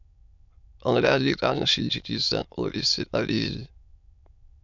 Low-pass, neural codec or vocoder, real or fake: 7.2 kHz; autoencoder, 22.05 kHz, a latent of 192 numbers a frame, VITS, trained on many speakers; fake